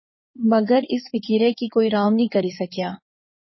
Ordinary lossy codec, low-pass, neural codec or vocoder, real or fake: MP3, 24 kbps; 7.2 kHz; codec, 16 kHz in and 24 kHz out, 2.2 kbps, FireRedTTS-2 codec; fake